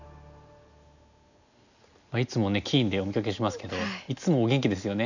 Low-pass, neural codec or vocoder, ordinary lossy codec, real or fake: 7.2 kHz; none; none; real